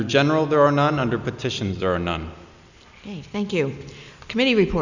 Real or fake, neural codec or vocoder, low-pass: real; none; 7.2 kHz